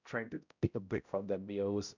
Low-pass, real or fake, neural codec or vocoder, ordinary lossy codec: 7.2 kHz; fake; codec, 16 kHz, 0.5 kbps, X-Codec, HuBERT features, trained on balanced general audio; none